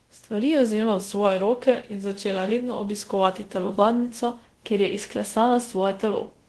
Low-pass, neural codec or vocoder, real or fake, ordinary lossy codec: 10.8 kHz; codec, 24 kHz, 0.5 kbps, DualCodec; fake; Opus, 16 kbps